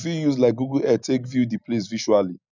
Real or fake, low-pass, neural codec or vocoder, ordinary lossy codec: real; 7.2 kHz; none; none